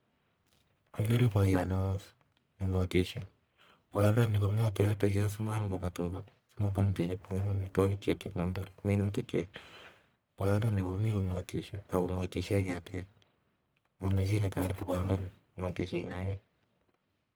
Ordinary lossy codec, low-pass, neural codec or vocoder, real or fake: none; none; codec, 44.1 kHz, 1.7 kbps, Pupu-Codec; fake